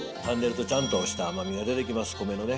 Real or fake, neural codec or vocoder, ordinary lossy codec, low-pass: real; none; none; none